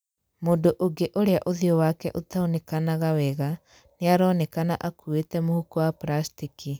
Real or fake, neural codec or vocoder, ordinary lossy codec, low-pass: real; none; none; none